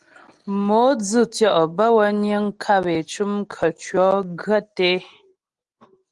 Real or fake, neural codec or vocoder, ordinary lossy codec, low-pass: real; none; Opus, 24 kbps; 10.8 kHz